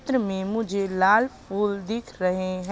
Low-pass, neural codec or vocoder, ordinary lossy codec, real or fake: none; none; none; real